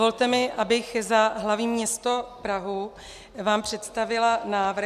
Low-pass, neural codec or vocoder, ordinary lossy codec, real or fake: 14.4 kHz; none; MP3, 96 kbps; real